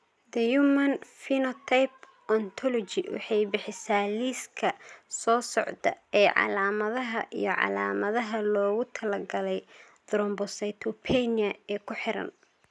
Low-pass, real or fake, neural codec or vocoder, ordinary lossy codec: none; real; none; none